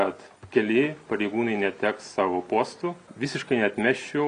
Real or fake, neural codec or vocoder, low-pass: real; none; 9.9 kHz